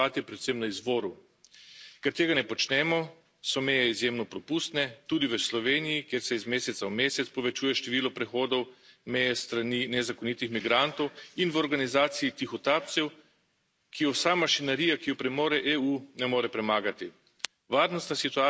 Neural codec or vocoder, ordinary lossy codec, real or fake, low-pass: none; none; real; none